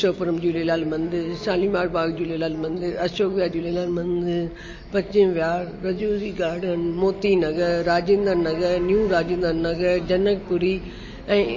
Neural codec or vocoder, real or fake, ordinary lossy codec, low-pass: none; real; MP3, 32 kbps; 7.2 kHz